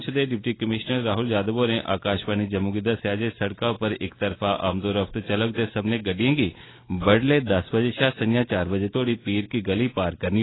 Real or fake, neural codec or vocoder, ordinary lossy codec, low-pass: real; none; AAC, 16 kbps; 7.2 kHz